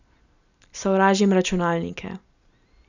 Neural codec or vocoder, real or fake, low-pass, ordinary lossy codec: none; real; 7.2 kHz; none